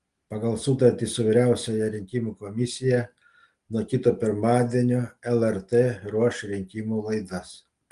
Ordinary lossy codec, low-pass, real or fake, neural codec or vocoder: Opus, 24 kbps; 10.8 kHz; real; none